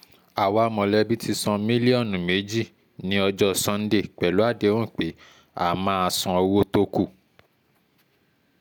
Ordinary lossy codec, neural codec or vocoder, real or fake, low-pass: none; none; real; none